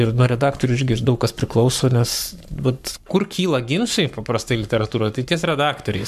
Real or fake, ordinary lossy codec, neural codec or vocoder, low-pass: fake; MP3, 96 kbps; codec, 44.1 kHz, 7.8 kbps, Pupu-Codec; 14.4 kHz